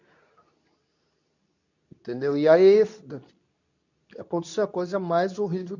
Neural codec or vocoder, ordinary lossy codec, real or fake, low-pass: codec, 24 kHz, 0.9 kbps, WavTokenizer, medium speech release version 2; none; fake; 7.2 kHz